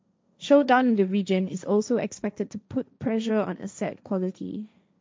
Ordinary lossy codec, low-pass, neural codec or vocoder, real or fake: none; none; codec, 16 kHz, 1.1 kbps, Voila-Tokenizer; fake